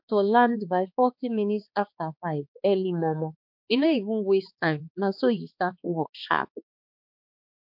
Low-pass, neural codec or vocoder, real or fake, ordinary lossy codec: 5.4 kHz; codec, 16 kHz, 2 kbps, X-Codec, HuBERT features, trained on balanced general audio; fake; MP3, 48 kbps